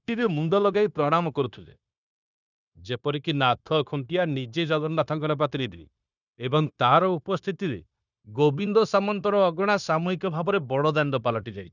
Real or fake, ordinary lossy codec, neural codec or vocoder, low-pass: fake; none; codec, 16 kHz, 0.9 kbps, LongCat-Audio-Codec; 7.2 kHz